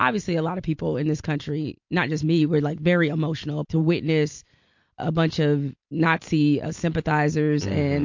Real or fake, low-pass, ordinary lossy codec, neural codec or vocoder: real; 7.2 kHz; MP3, 48 kbps; none